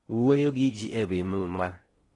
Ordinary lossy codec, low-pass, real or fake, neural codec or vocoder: AAC, 32 kbps; 10.8 kHz; fake; codec, 16 kHz in and 24 kHz out, 0.6 kbps, FocalCodec, streaming, 4096 codes